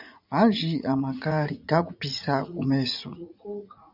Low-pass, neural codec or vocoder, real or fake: 5.4 kHz; vocoder, 44.1 kHz, 80 mel bands, Vocos; fake